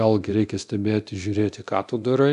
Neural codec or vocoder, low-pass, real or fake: codec, 24 kHz, 0.9 kbps, DualCodec; 10.8 kHz; fake